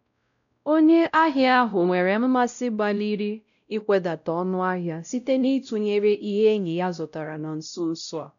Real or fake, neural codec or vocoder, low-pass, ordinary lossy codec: fake; codec, 16 kHz, 0.5 kbps, X-Codec, WavLM features, trained on Multilingual LibriSpeech; 7.2 kHz; none